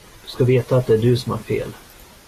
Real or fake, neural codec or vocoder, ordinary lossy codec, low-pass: real; none; AAC, 48 kbps; 14.4 kHz